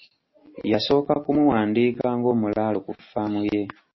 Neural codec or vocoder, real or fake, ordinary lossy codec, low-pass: none; real; MP3, 24 kbps; 7.2 kHz